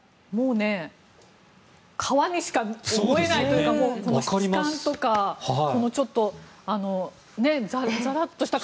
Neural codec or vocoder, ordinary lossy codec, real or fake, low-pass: none; none; real; none